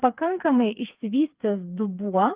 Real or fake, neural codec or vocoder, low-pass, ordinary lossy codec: fake; codec, 16 kHz, 4 kbps, FreqCodec, smaller model; 3.6 kHz; Opus, 24 kbps